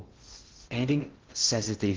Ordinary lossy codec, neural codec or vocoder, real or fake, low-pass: Opus, 16 kbps; codec, 16 kHz in and 24 kHz out, 0.6 kbps, FocalCodec, streaming, 2048 codes; fake; 7.2 kHz